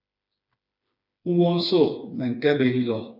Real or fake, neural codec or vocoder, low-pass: fake; codec, 16 kHz, 4 kbps, FreqCodec, smaller model; 5.4 kHz